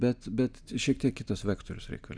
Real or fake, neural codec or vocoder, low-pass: real; none; 9.9 kHz